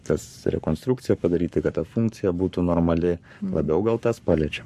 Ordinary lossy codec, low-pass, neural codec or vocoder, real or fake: MP3, 64 kbps; 14.4 kHz; codec, 44.1 kHz, 7.8 kbps, Pupu-Codec; fake